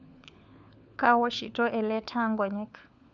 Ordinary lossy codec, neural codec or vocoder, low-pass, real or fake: none; codec, 16 kHz, 4 kbps, FunCodec, trained on LibriTTS, 50 frames a second; 7.2 kHz; fake